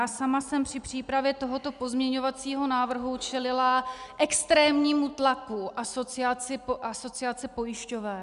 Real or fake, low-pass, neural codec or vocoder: real; 10.8 kHz; none